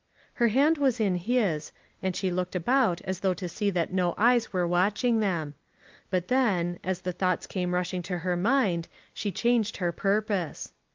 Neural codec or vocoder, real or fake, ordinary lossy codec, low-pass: none; real; Opus, 24 kbps; 7.2 kHz